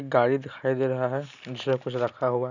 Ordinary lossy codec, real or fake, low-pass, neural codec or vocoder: none; real; 7.2 kHz; none